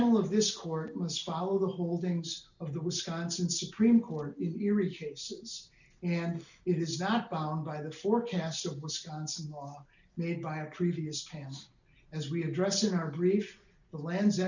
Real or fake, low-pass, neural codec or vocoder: real; 7.2 kHz; none